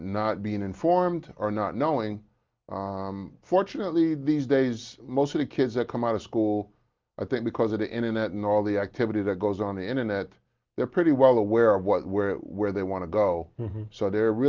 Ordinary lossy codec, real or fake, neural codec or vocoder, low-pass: Opus, 32 kbps; real; none; 7.2 kHz